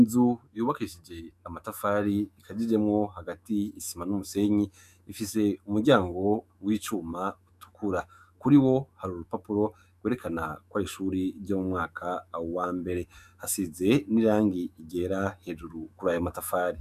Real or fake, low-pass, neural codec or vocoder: fake; 14.4 kHz; autoencoder, 48 kHz, 128 numbers a frame, DAC-VAE, trained on Japanese speech